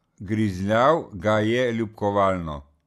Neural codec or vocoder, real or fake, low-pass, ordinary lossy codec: none; real; 14.4 kHz; none